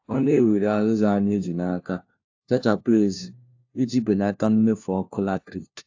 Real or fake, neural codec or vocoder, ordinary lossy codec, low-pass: fake; codec, 16 kHz, 1 kbps, FunCodec, trained on LibriTTS, 50 frames a second; AAC, 48 kbps; 7.2 kHz